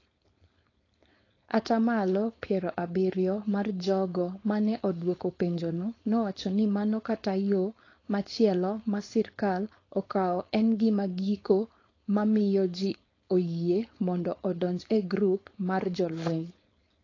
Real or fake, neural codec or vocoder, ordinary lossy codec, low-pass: fake; codec, 16 kHz, 4.8 kbps, FACodec; AAC, 32 kbps; 7.2 kHz